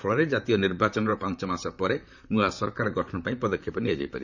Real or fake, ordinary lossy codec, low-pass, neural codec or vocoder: fake; none; 7.2 kHz; vocoder, 44.1 kHz, 128 mel bands, Pupu-Vocoder